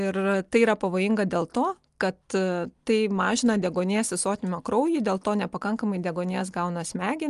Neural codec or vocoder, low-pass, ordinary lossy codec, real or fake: none; 10.8 kHz; Opus, 32 kbps; real